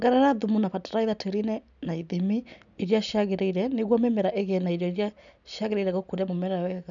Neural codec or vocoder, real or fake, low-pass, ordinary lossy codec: none; real; 7.2 kHz; none